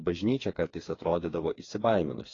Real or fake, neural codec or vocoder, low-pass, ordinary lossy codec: fake; codec, 16 kHz, 4 kbps, FreqCodec, smaller model; 7.2 kHz; AAC, 32 kbps